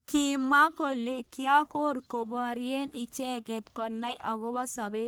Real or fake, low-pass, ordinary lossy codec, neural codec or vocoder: fake; none; none; codec, 44.1 kHz, 1.7 kbps, Pupu-Codec